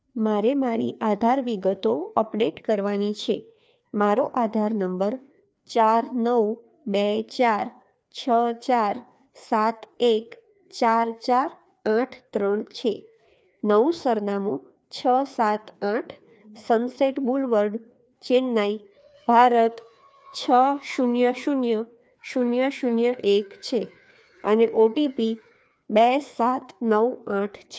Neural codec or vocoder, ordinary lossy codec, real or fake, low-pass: codec, 16 kHz, 2 kbps, FreqCodec, larger model; none; fake; none